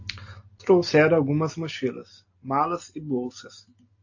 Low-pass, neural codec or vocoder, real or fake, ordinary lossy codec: 7.2 kHz; none; real; AAC, 48 kbps